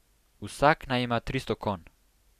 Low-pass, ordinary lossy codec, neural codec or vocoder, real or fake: 14.4 kHz; none; none; real